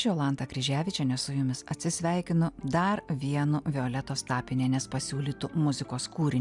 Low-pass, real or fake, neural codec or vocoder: 10.8 kHz; real; none